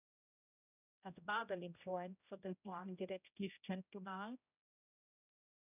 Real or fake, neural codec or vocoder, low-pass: fake; codec, 16 kHz, 0.5 kbps, X-Codec, HuBERT features, trained on general audio; 3.6 kHz